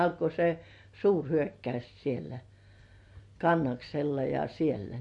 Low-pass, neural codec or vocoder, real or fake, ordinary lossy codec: 10.8 kHz; none; real; MP3, 48 kbps